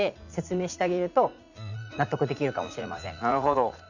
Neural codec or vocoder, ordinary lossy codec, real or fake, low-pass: vocoder, 44.1 kHz, 80 mel bands, Vocos; none; fake; 7.2 kHz